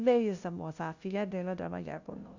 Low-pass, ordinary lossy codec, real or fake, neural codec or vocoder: 7.2 kHz; Opus, 64 kbps; fake; codec, 16 kHz, 0.5 kbps, FunCodec, trained on LibriTTS, 25 frames a second